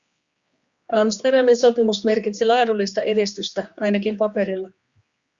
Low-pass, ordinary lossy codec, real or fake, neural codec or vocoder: 7.2 kHz; Opus, 64 kbps; fake; codec, 16 kHz, 2 kbps, X-Codec, HuBERT features, trained on general audio